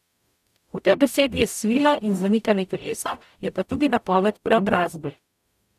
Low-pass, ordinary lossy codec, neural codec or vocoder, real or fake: 14.4 kHz; none; codec, 44.1 kHz, 0.9 kbps, DAC; fake